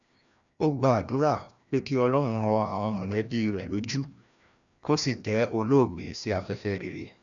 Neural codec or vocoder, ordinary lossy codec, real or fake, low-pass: codec, 16 kHz, 1 kbps, FreqCodec, larger model; MP3, 96 kbps; fake; 7.2 kHz